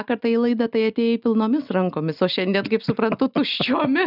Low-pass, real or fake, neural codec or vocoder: 5.4 kHz; real; none